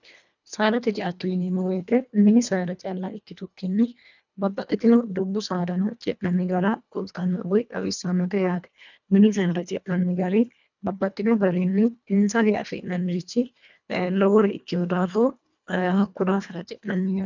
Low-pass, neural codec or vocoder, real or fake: 7.2 kHz; codec, 24 kHz, 1.5 kbps, HILCodec; fake